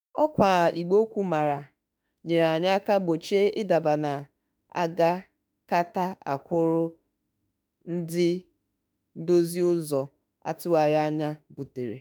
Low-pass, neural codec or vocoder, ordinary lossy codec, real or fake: none; autoencoder, 48 kHz, 32 numbers a frame, DAC-VAE, trained on Japanese speech; none; fake